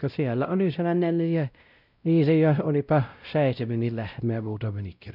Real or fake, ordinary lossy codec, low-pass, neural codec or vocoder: fake; none; 5.4 kHz; codec, 16 kHz, 0.5 kbps, X-Codec, WavLM features, trained on Multilingual LibriSpeech